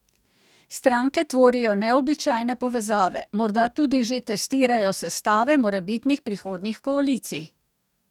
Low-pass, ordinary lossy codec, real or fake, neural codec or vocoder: 19.8 kHz; none; fake; codec, 44.1 kHz, 2.6 kbps, DAC